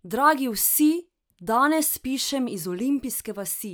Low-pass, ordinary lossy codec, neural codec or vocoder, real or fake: none; none; none; real